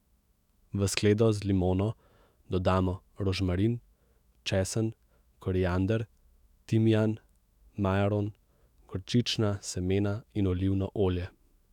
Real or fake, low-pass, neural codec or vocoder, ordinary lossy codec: fake; 19.8 kHz; autoencoder, 48 kHz, 128 numbers a frame, DAC-VAE, trained on Japanese speech; none